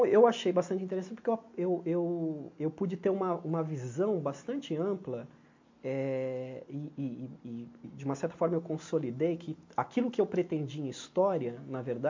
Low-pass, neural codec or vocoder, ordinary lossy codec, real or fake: 7.2 kHz; none; MP3, 48 kbps; real